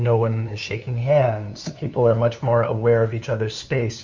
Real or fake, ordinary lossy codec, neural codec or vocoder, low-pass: fake; MP3, 48 kbps; codec, 16 kHz, 2 kbps, FunCodec, trained on LibriTTS, 25 frames a second; 7.2 kHz